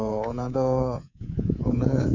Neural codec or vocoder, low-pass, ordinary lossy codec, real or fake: codec, 24 kHz, 3.1 kbps, DualCodec; 7.2 kHz; none; fake